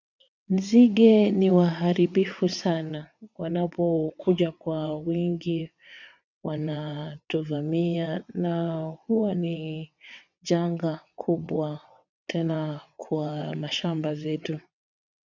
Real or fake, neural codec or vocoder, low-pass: fake; vocoder, 22.05 kHz, 80 mel bands, WaveNeXt; 7.2 kHz